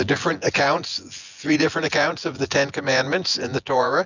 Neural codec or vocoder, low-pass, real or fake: vocoder, 24 kHz, 100 mel bands, Vocos; 7.2 kHz; fake